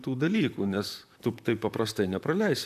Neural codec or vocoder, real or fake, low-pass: vocoder, 44.1 kHz, 128 mel bands, Pupu-Vocoder; fake; 14.4 kHz